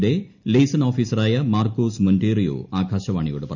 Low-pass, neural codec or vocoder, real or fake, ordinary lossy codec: 7.2 kHz; none; real; none